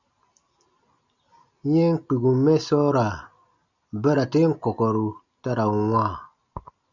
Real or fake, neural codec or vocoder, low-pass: real; none; 7.2 kHz